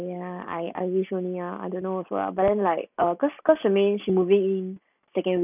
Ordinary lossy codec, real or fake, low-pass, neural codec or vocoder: none; real; 3.6 kHz; none